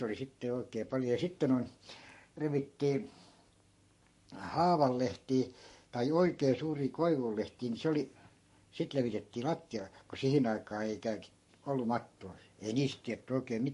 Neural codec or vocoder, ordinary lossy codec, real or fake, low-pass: codec, 44.1 kHz, 7.8 kbps, Pupu-Codec; MP3, 48 kbps; fake; 14.4 kHz